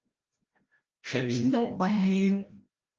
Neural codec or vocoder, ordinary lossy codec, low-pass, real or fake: codec, 16 kHz, 0.5 kbps, FreqCodec, larger model; Opus, 16 kbps; 7.2 kHz; fake